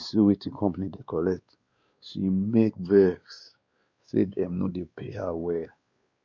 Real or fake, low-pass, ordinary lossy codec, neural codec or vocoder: fake; 7.2 kHz; Opus, 64 kbps; codec, 16 kHz, 2 kbps, X-Codec, WavLM features, trained on Multilingual LibriSpeech